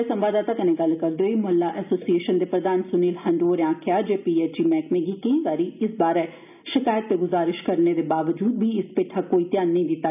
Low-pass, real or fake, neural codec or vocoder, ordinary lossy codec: 3.6 kHz; real; none; none